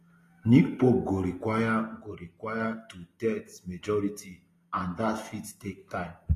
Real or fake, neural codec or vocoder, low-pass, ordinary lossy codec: real; none; 14.4 kHz; AAC, 48 kbps